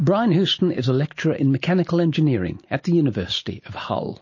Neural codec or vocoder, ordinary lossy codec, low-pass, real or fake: none; MP3, 32 kbps; 7.2 kHz; real